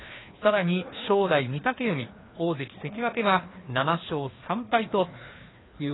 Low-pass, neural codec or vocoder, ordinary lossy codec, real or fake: 7.2 kHz; codec, 16 kHz, 2 kbps, FreqCodec, larger model; AAC, 16 kbps; fake